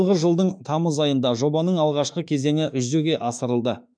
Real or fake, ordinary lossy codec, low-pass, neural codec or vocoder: fake; none; 9.9 kHz; autoencoder, 48 kHz, 32 numbers a frame, DAC-VAE, trained on Japanese speech